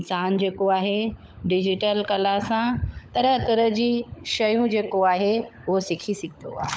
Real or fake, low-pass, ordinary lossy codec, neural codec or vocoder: fake; none; none; codec, 16 kHz, 16 kbps, FunCodec, trained on LibriTTS, 50 frames a second